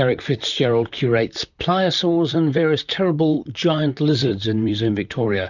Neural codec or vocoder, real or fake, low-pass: vocoder, 44.1 kHz, 128 mel bands, Pupu-Vocoder; fake; 7.2 kHz